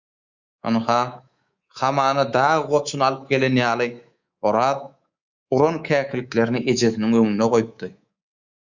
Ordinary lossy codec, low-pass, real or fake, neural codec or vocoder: Opus, 64 kbps; 7.2 kHz; fake; codec, 24 kHz, 3.1 kbps, DualCodec